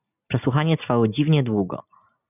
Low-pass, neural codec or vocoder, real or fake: 3.6 kHz; none; real